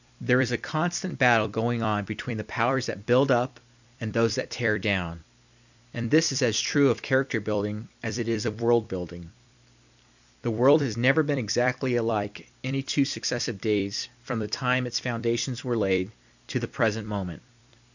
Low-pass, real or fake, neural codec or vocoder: 7.2 kHz; fake; vocoder, 44.1 kHz, 128 mel bands every 256 samples, BigVGAN v2